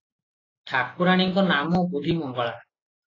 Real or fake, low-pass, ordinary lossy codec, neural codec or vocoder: real; 7.2 kHz; AAC, 32 kbps; none